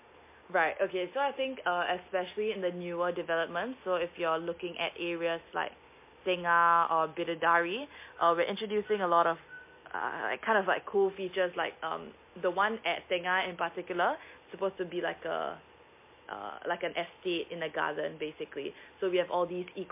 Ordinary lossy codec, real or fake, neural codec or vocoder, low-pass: MP3, 24 kbps; real; none; 3.6 kHz